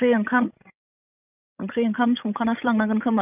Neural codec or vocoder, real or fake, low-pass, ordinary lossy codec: codec, 16 kHz, 16 kbps, FreqCodec, larger model; fake; 3.6 kHz; none